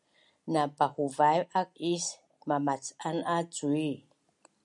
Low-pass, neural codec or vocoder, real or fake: 10.8 kHz; none; real